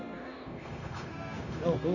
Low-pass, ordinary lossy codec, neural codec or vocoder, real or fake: 7.2 kHz; none; codec, 16 kHz, 0.9 kbps, LongCat-Audio-Codec; fake